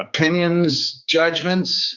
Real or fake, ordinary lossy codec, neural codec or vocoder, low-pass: fake; Opus, 64 kbps; codec, 16 kHz, 4 kbps, X-Codec, HuBERT features, trained on general audio; 7.2 kHz